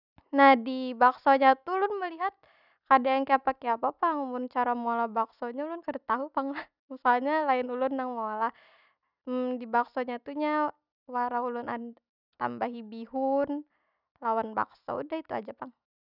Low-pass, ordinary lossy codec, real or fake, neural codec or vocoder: 5.4 kHz; none; real; none